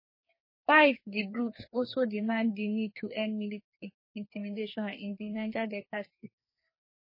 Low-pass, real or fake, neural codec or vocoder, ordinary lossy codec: 5.4 kHz; fake; codec, 44.1 kHz, 2.6 kbps, SNAC; MP3, 24 kbps